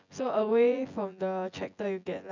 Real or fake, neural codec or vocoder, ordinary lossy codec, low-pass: fake; vocoder, 24 kHz, 100 mel bands, Vocos; none; 7.2 kHz